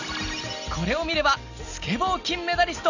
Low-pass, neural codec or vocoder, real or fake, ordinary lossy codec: 7.2 kHz; none; real; none